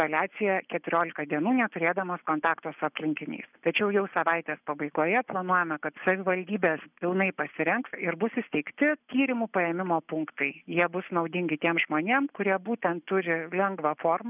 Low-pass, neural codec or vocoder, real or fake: 3.6 kHz; none; real